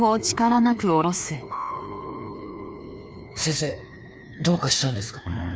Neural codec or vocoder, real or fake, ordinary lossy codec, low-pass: codec, 16 kHz, 2 kbps, FreqCodec, larger model; fake; none; none